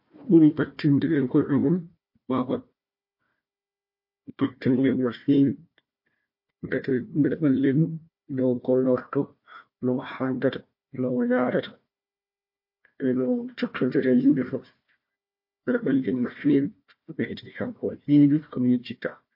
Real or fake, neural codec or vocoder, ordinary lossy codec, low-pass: fake; codec, 16 kHz, 1 kbps, FunCodec, trained on Chinese and English, 50 frames a second; MP3, 32 kbps; 5.4 kHz